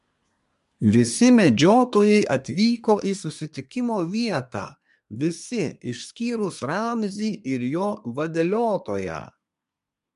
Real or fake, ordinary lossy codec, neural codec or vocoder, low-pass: fake; MP3, 64 kbps; codec, 24 kHz, 1 kbps, SNAC; 10.8 kHz